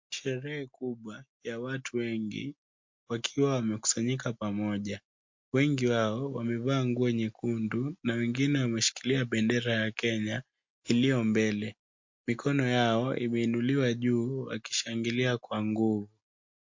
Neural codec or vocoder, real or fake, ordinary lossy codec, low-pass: none; real; MP3, 48 kbps; 7.2 kHz